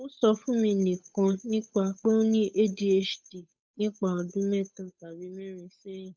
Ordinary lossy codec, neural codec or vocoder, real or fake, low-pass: none; codec, 16 kHz, 8 kbps, FunCodec, trained on Chinese and English, 25 frames a second; fake; none